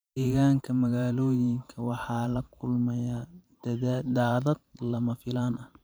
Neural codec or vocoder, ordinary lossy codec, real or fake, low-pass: vocoder, 44.1 kHz, 128 mel bands every 512 samples, BigVGAN v2; none; fake; none